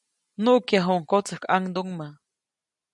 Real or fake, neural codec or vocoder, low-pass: real; none; 10.8 kHz